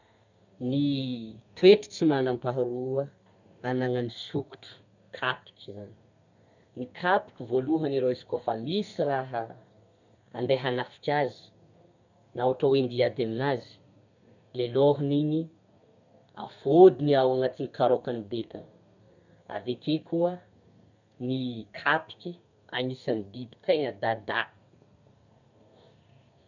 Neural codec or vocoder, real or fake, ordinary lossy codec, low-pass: codec, 32 kHz, 1.9 kbps, SNAC; fake; none; 7.2 kHz